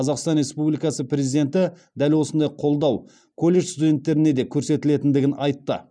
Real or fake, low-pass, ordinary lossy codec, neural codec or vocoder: real; 9.9 kHz; none; none